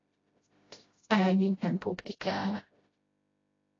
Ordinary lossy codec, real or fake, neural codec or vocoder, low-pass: AAC, 32 kbps; fake; codec, 16 kHz, 0.5 kbps, FreqCodec, smaller model; 7.2 kHz